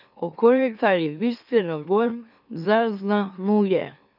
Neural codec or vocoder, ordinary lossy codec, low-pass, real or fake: autoencoder, 44.1 kHz, a latent of 192 numbers a frame, MeloTTS; none; 5.4 kHz; fake